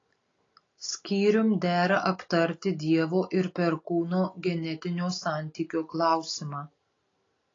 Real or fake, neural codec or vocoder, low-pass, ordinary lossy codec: real; none; 7.2 kHz; AAC, 32 kbps